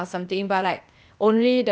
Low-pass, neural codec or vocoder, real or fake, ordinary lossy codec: none; codec, 16 kHz, 0.8 kbps, ZipCodec; fake; none